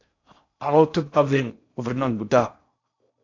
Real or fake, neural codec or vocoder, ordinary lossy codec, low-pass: fake; codec, 16 kHz in and 24 kHz out, 0.6 kbps, FocalCodec, streaming, 2048 codes; AAC, 48 kbps; 7.2 kHz